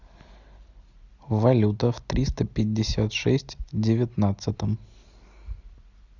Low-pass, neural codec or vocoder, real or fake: 7.2 kHz; none; real